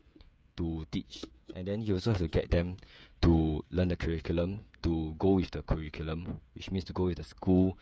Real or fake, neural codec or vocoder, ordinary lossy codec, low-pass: fake; codec, 16 kHz, 16 kbps, FreqCodec, smaller model; none; none